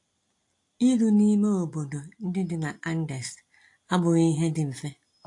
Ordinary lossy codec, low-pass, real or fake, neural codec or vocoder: AAC, 48 kbps; 10.8 kHz; real; none